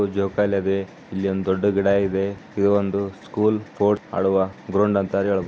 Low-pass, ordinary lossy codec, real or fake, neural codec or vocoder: none; none; real; none